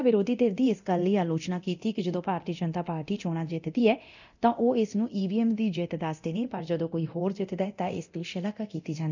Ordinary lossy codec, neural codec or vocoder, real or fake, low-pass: none; codec, 24 kHz, 0.9 kbps, DualCodec; fake; 7.2 kHz